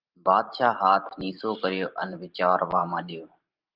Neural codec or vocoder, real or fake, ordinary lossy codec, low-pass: vocoder, 44.1 kHz, 128 mel bands every 512 samples, BigVGAN v2; fake; Opus, 24 kbps; 5.4 kHz